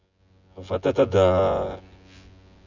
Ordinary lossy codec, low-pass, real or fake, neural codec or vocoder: none; 7.2 kHz; fake; vocoder, 24 kHz, 100 mel bands, Vocos